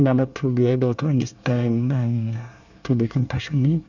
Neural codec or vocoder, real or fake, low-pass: codec, 24 kHz, 1 kbps, SNAC; fake; 7.2 kHz